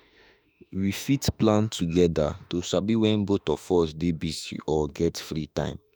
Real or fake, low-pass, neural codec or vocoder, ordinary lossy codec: fake; none; autoencoder, 48 kHz, 32 numbers a frame, DAC-VAE, trained on Japanese speech; none